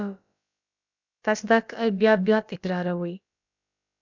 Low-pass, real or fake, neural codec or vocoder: 7.2 kHz; fake; codec, 16 kHz, about 1 kbps, DyCAST, with the encoder's durations